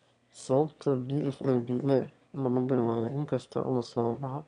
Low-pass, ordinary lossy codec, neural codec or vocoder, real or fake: 9.9 kHz; none; autoencoder, 22.05 kHz, a latent of 192 numbers a frame, VITS, trained on one speaker; fake